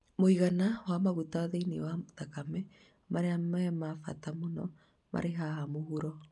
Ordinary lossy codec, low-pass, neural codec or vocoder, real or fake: MP3, 96 kbps; 10.8 kHz; none; real